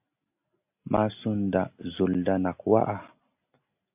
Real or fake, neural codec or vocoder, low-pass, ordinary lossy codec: real; none; 3.6 kHz; AAC, 32 kbps